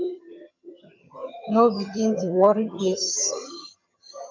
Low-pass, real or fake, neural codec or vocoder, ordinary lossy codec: 7.2 kHz; fake; vocoder, 22.05 kHz, 80 mel bands, HiFi-GAN; MP3, 64 kbps